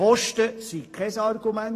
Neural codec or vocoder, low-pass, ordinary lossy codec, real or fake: vocoder, 44.1 kHz, 128 mel bands every 512 samples, BigVGAN v2; 14.4 kHz; AAC, 48 kbps; fake